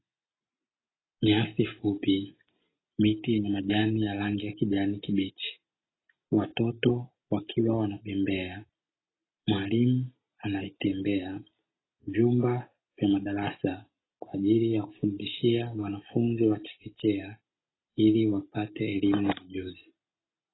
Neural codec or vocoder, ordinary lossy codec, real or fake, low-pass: none; AAC, 16 kbps; real; 7.2 kHz